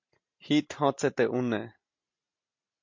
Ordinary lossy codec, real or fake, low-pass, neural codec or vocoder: MP3, 48 kbps; real; 7.2 kHz; none